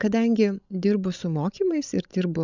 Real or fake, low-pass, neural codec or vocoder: fake; 7.2 kHz; codec, 16 kHz, 16 kbps, FreqCodec, larger model